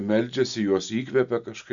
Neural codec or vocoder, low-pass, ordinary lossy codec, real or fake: none; 7.2 kHz; MP3, 96 kbps; real